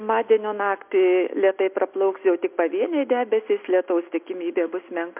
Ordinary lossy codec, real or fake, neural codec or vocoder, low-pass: MP3, 32 kbps; real; none; 3.6 kHz